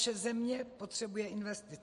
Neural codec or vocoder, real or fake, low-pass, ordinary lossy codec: none; real; 10.8 kHz; MP3, 48 kbps